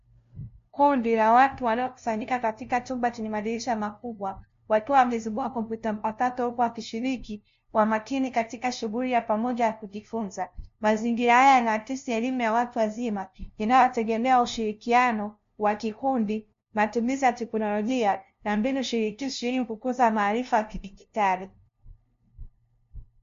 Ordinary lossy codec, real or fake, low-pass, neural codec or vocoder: MP3, 48 kbps; fake; 7.2 kHz; codec, 16 kHz, 0.5 kbps, FunCodec, trained on LibriTTS, 25 frames a second